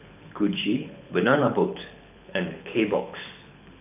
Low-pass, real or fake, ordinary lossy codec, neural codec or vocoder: 3.6 kHz; fake; none; codec, 24 kHz, 6 kbps, HILCodec